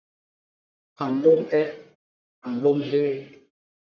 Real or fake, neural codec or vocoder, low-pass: fake; codec, 44.1 kHz, 1.7 kbps, Pupu-Codec; 7.2 kHz